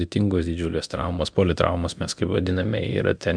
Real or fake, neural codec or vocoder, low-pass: fake; codec, 24 kHz, 0.9 kbps, DualCodec; 9.9 kHz